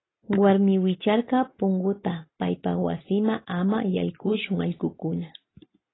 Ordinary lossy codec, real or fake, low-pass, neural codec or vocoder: AAC, 16 kbps; real; 7.2 kHz; none